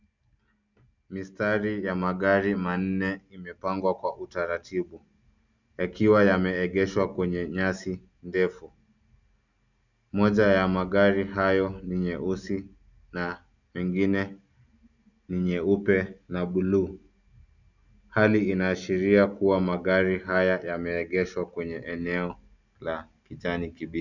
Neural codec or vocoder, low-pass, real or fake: none; 7.2 kHz; real